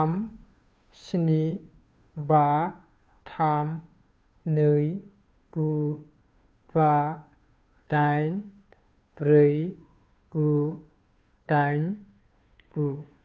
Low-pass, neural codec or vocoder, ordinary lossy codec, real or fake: none; codec, 16 kHz, 2 kbps, FunCodec, trained on Chinese and English, 25 frames a second; none; fake